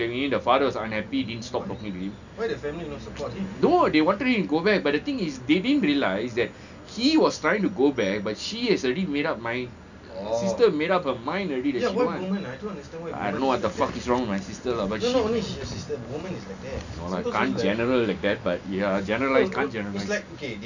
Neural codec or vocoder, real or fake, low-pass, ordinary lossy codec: none; real; 7.2 kHz; none